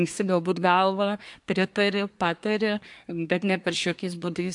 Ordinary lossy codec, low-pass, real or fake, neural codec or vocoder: AAC, 64 kbps; 10.8 kHz; fake; codec, 24 kHz, 1 kbps, SNAC